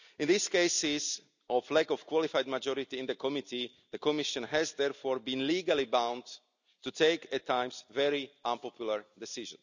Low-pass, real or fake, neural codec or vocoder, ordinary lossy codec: 7.2 kHz; real; none; none